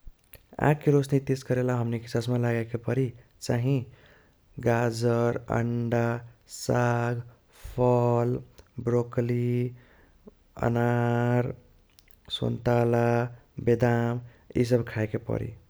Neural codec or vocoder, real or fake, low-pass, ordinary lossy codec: none; real; none; none